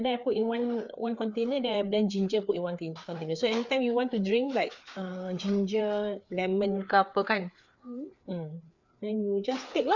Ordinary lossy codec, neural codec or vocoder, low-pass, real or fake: none; codec, 16 kHz, 4 kbps, FreqCodec, larger model; 7.2 kHz; fake